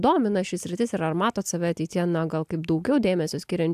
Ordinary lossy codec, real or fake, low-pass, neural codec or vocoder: AAC, 96 kbps; real; 14.4 kHz; none